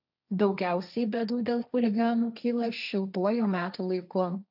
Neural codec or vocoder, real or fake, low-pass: codec, 16 kHz, 1.1 kbps, Voila-Tokenizer; fake; 5.4 kHz